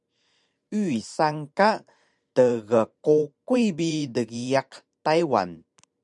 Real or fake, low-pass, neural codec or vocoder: fake; 10.8 kHz; vocoder, 44.1 kHz, 128 mel bands every 512 samples, BigVGAN v2